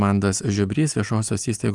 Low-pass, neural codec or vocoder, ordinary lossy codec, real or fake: 10.8 kHz; none; Opus, 32 kbps; real